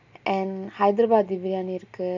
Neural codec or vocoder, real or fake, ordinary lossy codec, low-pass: none; real; none; 7.2 kHz